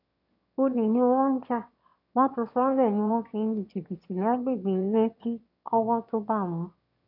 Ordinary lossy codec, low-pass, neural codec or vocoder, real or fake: none; 5.4 kHz; autoencoder, 22.05 kHz, a latent of 192 numbers a frame, VITS, trained on one speaker; fake